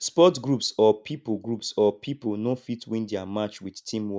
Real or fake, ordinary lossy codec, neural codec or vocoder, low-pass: real; none; none; none